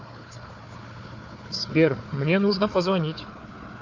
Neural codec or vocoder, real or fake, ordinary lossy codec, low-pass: codec, 16 kHz, 4 kbps, FunCodec, trained on Chinese and English, 50 frames a second; fake; AAC, 48 kbps; 7.2 kHz